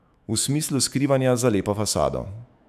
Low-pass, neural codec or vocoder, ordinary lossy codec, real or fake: 14.4 kHz; autoencoder, 48 kHz, 128 numbers a frame, DAC-VAE, trained on Japanese speech; none; fake